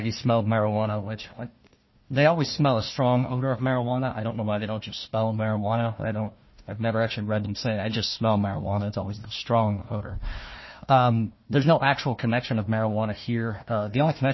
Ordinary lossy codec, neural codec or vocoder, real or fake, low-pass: MP3, 24 kbps; codec, 16 kHz, 1 kbps, FunCodec, trained on Chinese and English, 50 frames a second; fake; 7.2 kHz